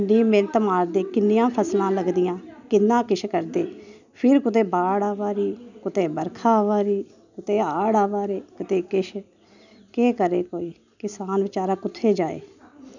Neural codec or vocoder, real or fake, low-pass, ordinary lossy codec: none; real; 7.2 kHz; none